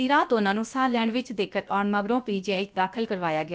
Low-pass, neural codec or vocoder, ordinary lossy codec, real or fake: none; codec, 16 kHz, about 1 kbps, DyCAST, with the encoder's durations; none; fake